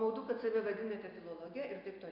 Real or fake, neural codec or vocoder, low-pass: real; none; 5.4 kHz